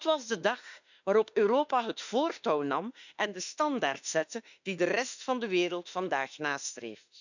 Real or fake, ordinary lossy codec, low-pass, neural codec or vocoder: fake; none; 7.2 kHz; autoencoder, 48 kHz, 32 numbers a frame, DAC-VAE, trained on Japanese speech